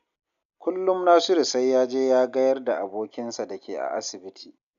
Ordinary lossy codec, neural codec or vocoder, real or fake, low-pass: none; none; real; 7.2 kHz